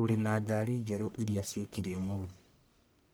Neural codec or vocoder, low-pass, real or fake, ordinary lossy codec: codec, 44.1 kHz, 1.7 kbps, Pupu-Codec; none; fake; none